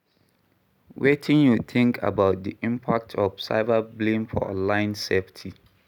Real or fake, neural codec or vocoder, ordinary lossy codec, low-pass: real; none; none; 19.8 kHz